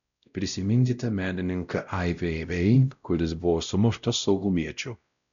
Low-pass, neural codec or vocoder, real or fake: 7.2 kHz; codec, 16 kHz, 0.5 kbps, X-Codec, WavLM features, trained on Multilingual LibriSpeech; fake